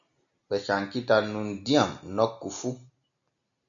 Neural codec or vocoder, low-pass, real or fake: none; 7.2 kHz; real